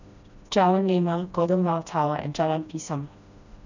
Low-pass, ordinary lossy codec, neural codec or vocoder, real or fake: 7.2 kHz; none; codec, 16 kHz, 1 kbps, FreqCodec, smaller model; fake